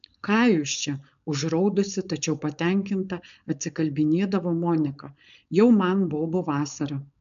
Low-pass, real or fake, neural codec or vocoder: 7.2 kHz; fake; codec, 16 kHz, 4.8 kbps, FACodec